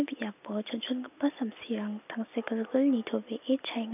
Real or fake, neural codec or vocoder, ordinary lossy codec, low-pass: real; none; none; 3.6 kHz